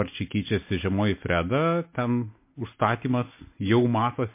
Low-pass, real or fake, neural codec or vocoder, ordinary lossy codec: 3.6 kHz; real; none; MP3, 24 kbps